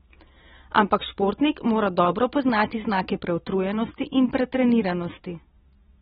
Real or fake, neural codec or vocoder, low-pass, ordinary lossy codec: real; none; 19.8 kHz; AAC, 16 kbps